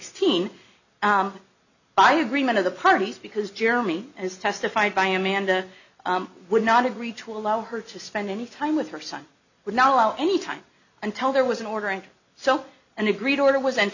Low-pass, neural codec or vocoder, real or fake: 7.2 kHz; none; real